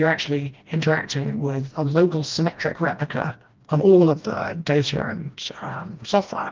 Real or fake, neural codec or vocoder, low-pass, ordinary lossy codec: fake; codec, 16 kHz, 1 kbps, FreqCodec, smaller model; 7.2 kHz; Opus, 32 kbps